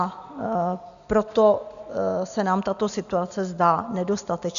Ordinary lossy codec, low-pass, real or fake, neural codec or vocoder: MP3, 96 kbps; 7.2 kHz; real; none